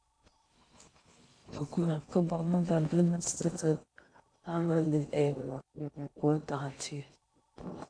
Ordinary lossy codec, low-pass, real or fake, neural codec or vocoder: AAC, 64 kbps; 9.9 kHz; fake; codec, 16 kHz in and 24 kHz out, 0.6 kbps, FocalCodec, streaming, 2048 codes